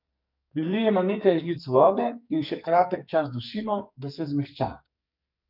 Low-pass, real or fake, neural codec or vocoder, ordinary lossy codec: 5.4 kHz; fake; codec, 44.1 kHz, 2.6 kbps, SNAC; none